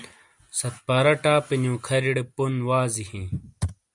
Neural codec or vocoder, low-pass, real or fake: none; 10.8 kHz; real